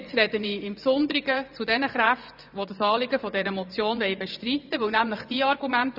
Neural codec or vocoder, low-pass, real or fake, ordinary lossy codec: vocoder, 24 kHz, 100 mel bands, Vocos; 5.4 kHz; fake; none